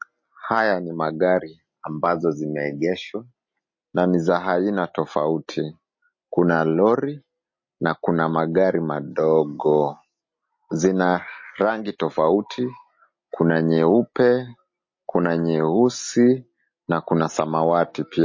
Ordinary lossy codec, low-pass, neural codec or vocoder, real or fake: MP3, 32 kbps; 7.2 kHz; none; real